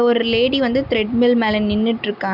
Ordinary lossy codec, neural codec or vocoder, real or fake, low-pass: none; none; real; 5.4 kHz